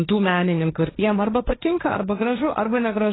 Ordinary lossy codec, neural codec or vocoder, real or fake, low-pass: AAC, 16 kbps; codec, 16 kHz, 1.1 kbps, Voila-Tokenizer; fake; 7.2 kHz